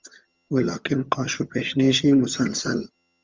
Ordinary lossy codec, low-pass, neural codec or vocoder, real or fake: Opus, 32 kbps; 7.2 kHz; vocoder, 22.05 kHz, 80 mel bands, HiFi-GAN; fake